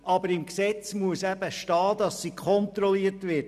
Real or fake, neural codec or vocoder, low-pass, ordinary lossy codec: real; none; 14.4 kHz; none